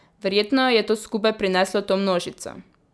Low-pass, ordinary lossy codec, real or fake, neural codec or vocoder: none; none; real; none